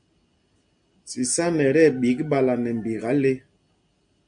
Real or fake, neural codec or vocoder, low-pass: real; none; 9.9 kHz